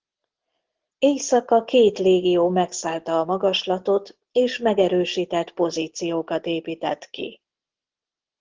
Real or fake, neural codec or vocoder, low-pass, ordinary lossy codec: real; none; 7.2 kHz; Opus, 16 kbps